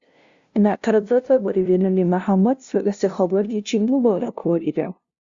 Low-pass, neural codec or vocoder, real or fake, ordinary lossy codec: 7.2 kHz; codec, 16 kHz, 0.5 kbps, FunCodec, trained on LibriTTS, 25 frames a second; fake; Opus, 64 kbps